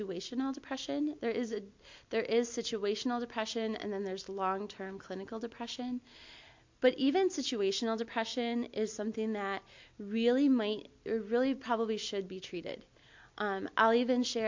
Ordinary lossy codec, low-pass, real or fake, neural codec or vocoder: MP3, 48 kbps; 7.2 kHz; real; none